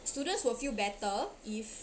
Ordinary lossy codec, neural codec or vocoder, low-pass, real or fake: none; none; none; real